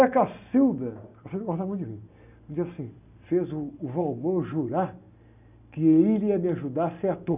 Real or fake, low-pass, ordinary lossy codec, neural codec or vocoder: real; 3.6 kHz; none; none